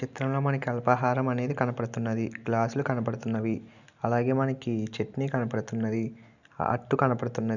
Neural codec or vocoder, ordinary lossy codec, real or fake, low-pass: none; none; real; 7.2 kHz